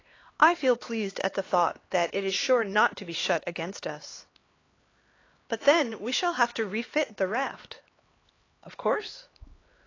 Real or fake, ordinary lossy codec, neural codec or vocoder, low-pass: fake; AAC, 32 kbps; codec, 16 kHz, 2 kbps, X-Codec, HuBERT features, trained on LibriSpeech; 7.2 kHz